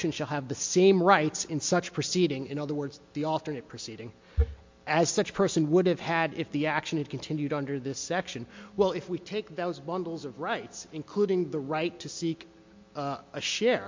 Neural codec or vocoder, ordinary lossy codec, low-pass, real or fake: none; MP3, 48 kbps; 7.2 kHz; real